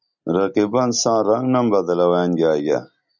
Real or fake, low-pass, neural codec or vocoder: real; 7.2 kHz; none